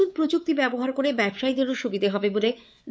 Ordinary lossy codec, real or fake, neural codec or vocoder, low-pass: none; fake; codec, 16 kHz, 4 kbps, X-Codec, WavLM features, trained on Multilingual LibriSpeech; none